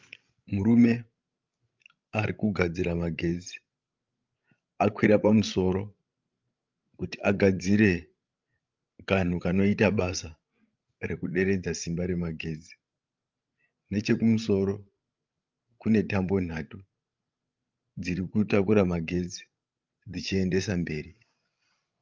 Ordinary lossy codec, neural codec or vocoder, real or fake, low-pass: Opus, 24 kbps; codec, 16 kHz, 16 kbps, FreqCodec, larger model; fake; 7.2 kHz